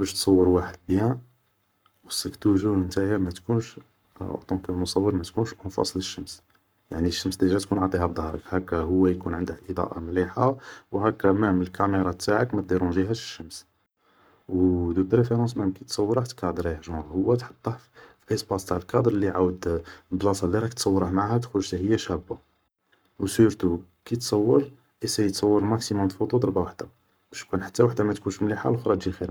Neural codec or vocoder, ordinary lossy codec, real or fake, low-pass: codec, 44.1 kHz, 7.8 kbps, Pupu-Codec; none; fake; none